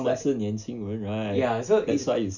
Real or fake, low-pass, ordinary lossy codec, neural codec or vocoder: real; 7.2 kHz; none; none